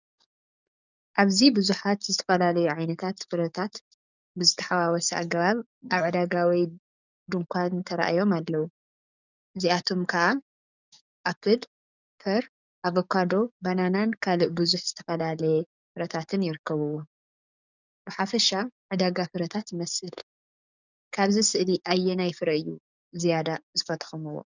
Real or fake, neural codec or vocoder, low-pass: fake; codec, 44.1 kHz, 7.8 kbps, DAC; 7.2 kHz